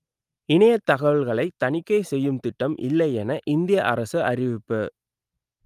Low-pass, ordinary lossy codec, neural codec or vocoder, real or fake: 14.4 kHz; Opus, 32 kbps; none; real